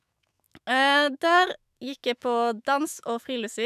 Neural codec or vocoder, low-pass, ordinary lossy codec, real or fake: autoencoder, 48 kHz, 128 numbers a frame, DAC-VAE, trained on Japanese speech; 14.4 kHz; none; fake